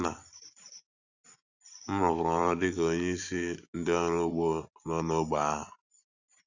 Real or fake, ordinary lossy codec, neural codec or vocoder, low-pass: fake; none; vocoder, 44.1 kHz, 128 mel bands every 512 samples, BigVGAN v2; 7.2 kHz